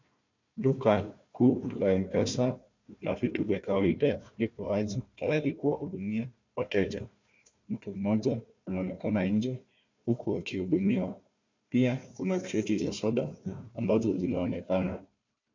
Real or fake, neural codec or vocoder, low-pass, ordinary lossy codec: fake; codec, 16 kHz, 1 kbps, FunCodec, trained on Chinese and English, 50 frames a second; 7.2 kHz; MP3, 64 kbps